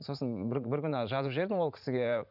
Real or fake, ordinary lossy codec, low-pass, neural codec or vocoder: fake; none; 5.4 kHz; vocoder, 44.1 kHz, 80 mel bands, Vocos